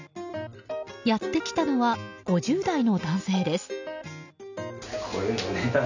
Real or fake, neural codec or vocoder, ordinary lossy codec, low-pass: real; none; none; 7.2 kHz